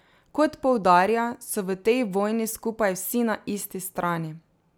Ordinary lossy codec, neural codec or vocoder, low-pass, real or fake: none; none; none; real